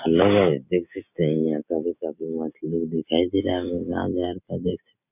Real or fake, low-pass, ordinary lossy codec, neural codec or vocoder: real; 3.6 kHz; none; none